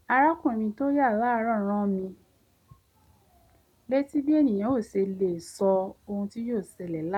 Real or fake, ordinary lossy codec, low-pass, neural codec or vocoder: real; none; 19.8 kHz; none